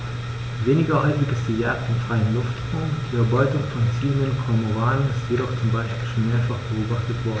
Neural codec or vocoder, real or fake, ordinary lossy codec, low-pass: none; real; none; none